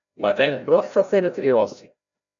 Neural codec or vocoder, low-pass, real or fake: codec, 16 kHz, 0.5 kbps, FreqCodec, larger model; 7.2 kHz; fake